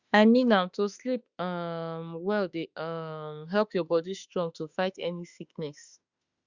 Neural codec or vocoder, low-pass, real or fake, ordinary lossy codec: autoencoder, 48 kHz, 32 numbers a frame, DAC-VAE, trained on Japanese speech; 7.2 kHz; fake; Opus, 64 kbps